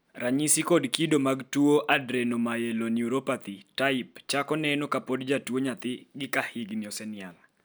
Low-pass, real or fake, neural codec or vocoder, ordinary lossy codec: none; real; none; none